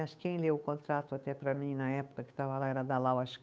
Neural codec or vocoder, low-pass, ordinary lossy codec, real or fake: codec, 16 kHz, 8 kbps, FunCodec, trained on Chinese and English, 25 frames a second; none; none; fake